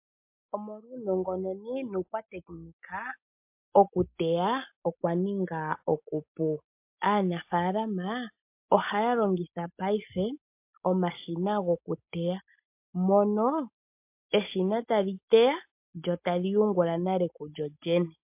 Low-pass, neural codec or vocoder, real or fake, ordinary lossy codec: 3.6 kHz; none; real; MP3, 32 kbps